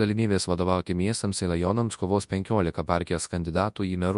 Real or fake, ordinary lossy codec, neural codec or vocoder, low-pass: fake; MP3, 64 kbps; codec, 24 kHz, 0.9 kbps, WavTokenizer, large speech release; 10.8 kHz